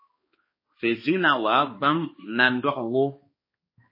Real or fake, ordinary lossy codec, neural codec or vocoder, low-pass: fake; MP3, 24 kbps; codec, 16 kHz, 2 kbps, X-Codec, HuBERT features, trained on balanced general audio; 5.4 kHz